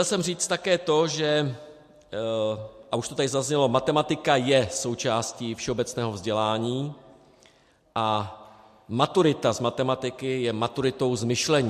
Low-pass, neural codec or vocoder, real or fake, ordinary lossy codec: 14.4 kHz; none; real; MP3, 64 kbps